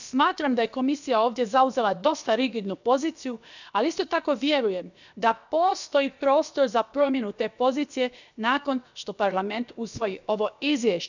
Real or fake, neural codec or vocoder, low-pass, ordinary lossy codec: fake; codec, 16 kHz, about 1 kbps, DyCAST, with the encoder's durations; 7.2 kHz; none